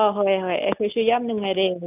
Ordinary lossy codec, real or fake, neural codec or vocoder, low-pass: none; real; none; 3.6 kHz